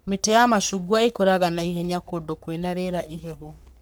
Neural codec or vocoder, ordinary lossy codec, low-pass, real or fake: codec, 44.1 kHz, 3.4 kbps, Pupu-Codec; none; none; fake